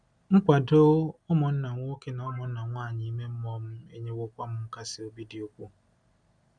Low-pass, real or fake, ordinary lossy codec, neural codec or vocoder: 9.9 kHz; real; MP3, 96 kbps; none